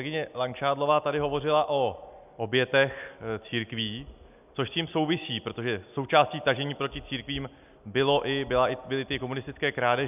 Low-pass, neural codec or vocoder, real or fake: 3.6 kHz; none; real